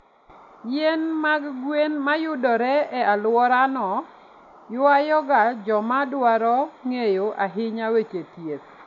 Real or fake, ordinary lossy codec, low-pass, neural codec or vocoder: real; none; 7.2 kHz; none